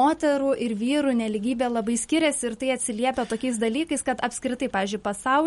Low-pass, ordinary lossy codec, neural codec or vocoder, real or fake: 19.8 kHz; MP3, 48 kbps; none; real